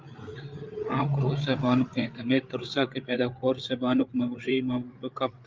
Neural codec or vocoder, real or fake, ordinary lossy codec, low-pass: codec, 16 kHz, 8 kbps, FreqCodec, larger model; fake; Opus, 32 kbps; 7.2 kHz